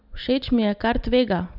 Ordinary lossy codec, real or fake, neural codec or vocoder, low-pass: none; real; none; 5.4 kHz